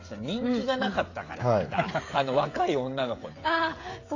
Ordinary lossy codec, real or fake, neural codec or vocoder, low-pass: AAC, 32 kbps; fake; codec, 16 kHz, 16 kbps, FreqCodec, smaller model; 7.2 kHz